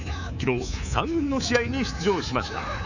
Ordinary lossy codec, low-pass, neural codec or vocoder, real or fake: none; 7.2 kHz; codec, 24 kHz, 3.1 kbps, DualCodec; fake